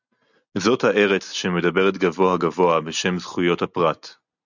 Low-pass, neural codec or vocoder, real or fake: 7.2 kHz; none; real